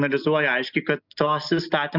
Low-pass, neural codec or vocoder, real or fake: 5.4 kHz; none; real